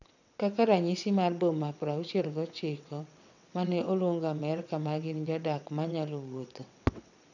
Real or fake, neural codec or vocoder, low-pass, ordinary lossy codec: fake; vocoder, 22.05 kHz, 80 mel bands, WaveNeXt; 7.2 kHz; none